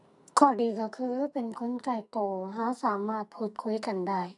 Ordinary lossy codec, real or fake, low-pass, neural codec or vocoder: none; fake; 10.8 kHz; codec, 44.1 kHz, 2.6 kbps, SNAC